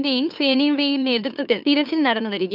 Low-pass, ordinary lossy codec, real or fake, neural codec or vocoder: 5.4 kHz; none; fake; autoencoder, 44.1 kHz, a latent of 192 numbers a frame, MeloTTS